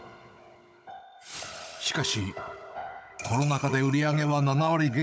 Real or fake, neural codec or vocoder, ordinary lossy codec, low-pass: fake; codec, 16 kHz, 16 kbps, FunCodec, trained on Chinese and English, 50 frames a second; none; none